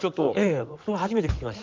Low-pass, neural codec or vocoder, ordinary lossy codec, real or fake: 7.2 kHz; codec, 16 kHz in and 24 kHz out, 2.2 kbps, FireRedTTS-2 codec; Opus, 32 kbps; fake